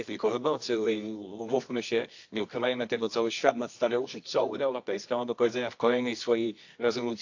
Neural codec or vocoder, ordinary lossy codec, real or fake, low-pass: codec, 24 kHz, 0.9 kbps, WavTokenizer, medium music audio release; AAC, 48 kbps; fake; 7.2 kHz